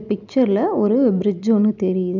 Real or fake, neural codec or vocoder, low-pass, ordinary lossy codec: real; none; 7.2 kHz; none